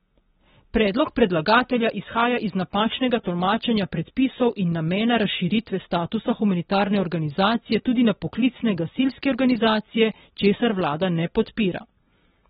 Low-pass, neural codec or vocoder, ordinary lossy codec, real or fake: 19.8 kHz; none; AAC, 16 kbps; real